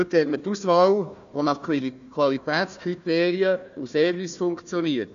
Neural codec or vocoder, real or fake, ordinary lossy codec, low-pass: codec, 16 kHz, 1 kbps, FunCodec, trained on Chinese and English, 50 frames a second; fake; AAC, 96 kbps; 7.2 kHz